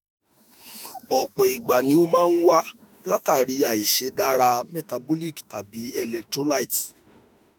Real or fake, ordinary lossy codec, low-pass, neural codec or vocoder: fake; none; none; autoencoder, 48 kHz, 32 numbers a frame, DAC-VAE, trained on Japanese speech